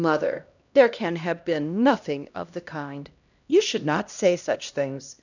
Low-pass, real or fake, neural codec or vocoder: 7.2 kHz; fake; codec, 16 kHz, 1 kbps, X-Codec, HuBERT features, trained on LibriSpeech